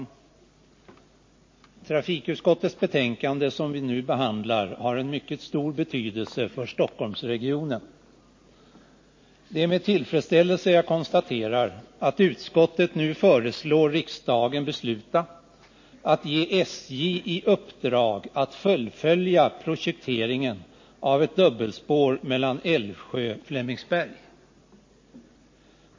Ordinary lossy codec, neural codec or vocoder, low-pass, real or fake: MP3, 32 kbps; none; 7.2 kHz; real